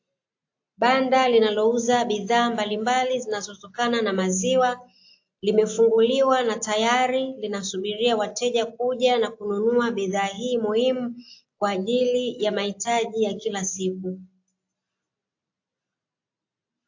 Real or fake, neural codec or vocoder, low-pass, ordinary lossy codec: real; none; 7.2 kHz; AAC, 48 kbps